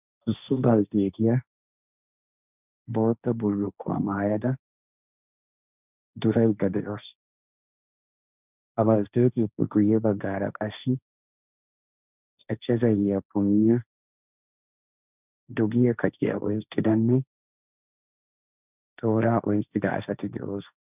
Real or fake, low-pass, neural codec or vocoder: fake; 3.6 kHz; codec, 16 kHz, 1.1 kbps, Voila-Tokenizer